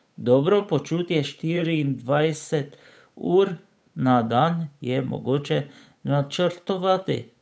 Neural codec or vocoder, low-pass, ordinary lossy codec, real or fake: codec, 16 kHz, 8 kbps, FunCodec, trained on Chinese and English, 25 frames a second; none; none; fake